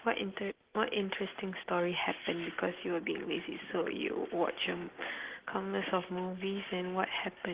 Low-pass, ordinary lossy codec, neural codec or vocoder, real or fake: 3.6 kHz; Opus, 16 kbps; none; real